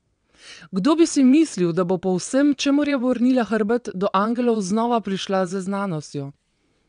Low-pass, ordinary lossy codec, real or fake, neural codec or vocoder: 9.9 kHz; MP3, 96 kbps; fake; vocoder, 22.05 kHz, 80 mel bands, WaveNeXt